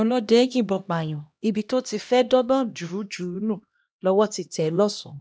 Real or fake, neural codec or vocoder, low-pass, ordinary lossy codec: fake; codec, 16 kHz, 1 kbps, X-Codec, HuBERT features, trained on LibriSpeech; none; none